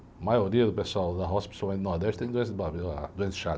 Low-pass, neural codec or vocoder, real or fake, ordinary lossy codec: none; none; real; none